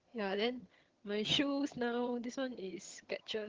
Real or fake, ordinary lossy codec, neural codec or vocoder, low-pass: fake; Opus, 16 kbps; vocoder, 22.05 kHz, 80 mel bands, HiFi-GAN; 7.2 kHz